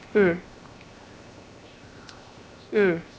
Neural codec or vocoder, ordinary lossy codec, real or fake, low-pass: codec, 16 kHz, 0.7 kbps, FocalCodec; none; fake; none